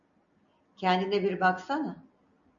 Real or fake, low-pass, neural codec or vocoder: real; 7.2 kHz; none